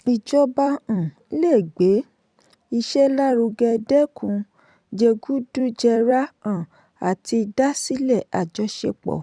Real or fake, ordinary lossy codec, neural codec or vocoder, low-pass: fake; none; vocoder, 44.1 kHz, 128 mel bands every 256 samples, BigVGAN v2; 9.9 kHz